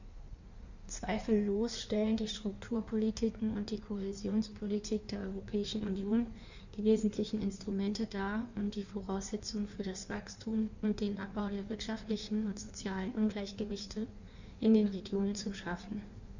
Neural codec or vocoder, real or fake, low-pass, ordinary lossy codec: codec, 16 kHz in and 24 kHz out, 1.1 kbps, FireRedTTS-2 codec; fake; 7.2 kHz; none